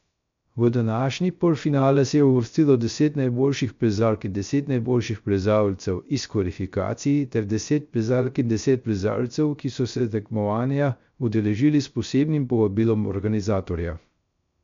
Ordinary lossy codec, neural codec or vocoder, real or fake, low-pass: MP3, 64 kbps; codec, 16 kHz, 0.3 kbps, FocalCodec; fake; 7.2 kHz